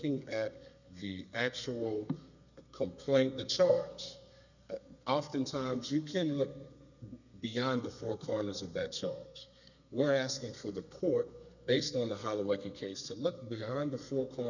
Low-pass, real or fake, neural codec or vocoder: 7.2 kHz; fake; codec, 32 kHz, 1.9 kbps, SNAC